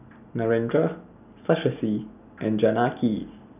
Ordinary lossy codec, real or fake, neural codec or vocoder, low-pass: none; real; none; 3.6 kHz